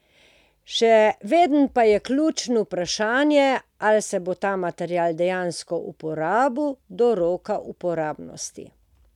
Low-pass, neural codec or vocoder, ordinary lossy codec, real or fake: 19.8 kHz; none; none; real